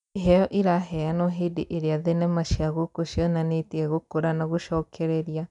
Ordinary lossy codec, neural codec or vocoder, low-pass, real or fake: none; none; 10.8 kHz; real